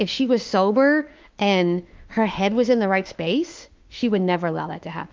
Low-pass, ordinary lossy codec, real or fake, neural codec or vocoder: 7.2 kHz; Opus, 24 kbps; fake; autoencoder, 48 kHz, 32 numbers a frame, DAC-VAE, trained on Japanese speech